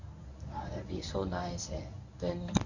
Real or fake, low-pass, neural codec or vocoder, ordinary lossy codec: fake; 7.2 kHz; codec, 24 kHz, 0.9 kbps, WavTokenizer, medium speech release version 1; none